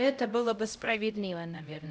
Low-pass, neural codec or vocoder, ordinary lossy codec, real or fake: none; codec, 16 kHz, 0.5 kbps, X-Codec, HuBERT features, trained on LibriSpeech; none; fake